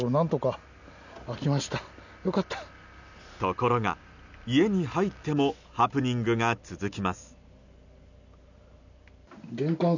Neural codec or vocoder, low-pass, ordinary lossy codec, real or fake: none; 7.2 kHz; none; real